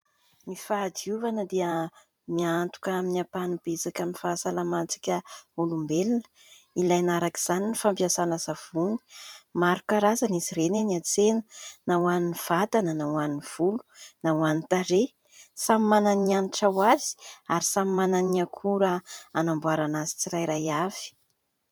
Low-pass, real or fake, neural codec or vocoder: 19.8 kHz; fake; vocoder, 44.1 kHz, 128 mel bands every 512 samples, BigVGAN v2